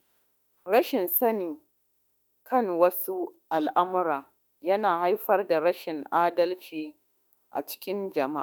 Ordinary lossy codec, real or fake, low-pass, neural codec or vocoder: none; fake; none; autoencoder, 48 kHz, 32 numbers a frame, DAC-VAE, trained on Japanese speech